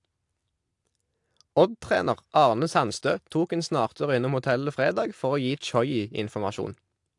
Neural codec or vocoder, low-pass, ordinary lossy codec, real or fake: none; 10.8 kHz; AAC, 64 kbps; real